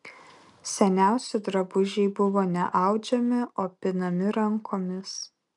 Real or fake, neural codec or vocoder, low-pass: real; none; 10.8 kHz